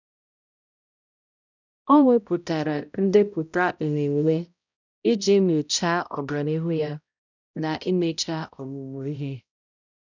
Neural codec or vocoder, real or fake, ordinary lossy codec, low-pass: codec, 16 kHz, 0.5 kbps, X-Codec, HuBERT features, trained on balanced general audio; fake; none; 7.2 kHz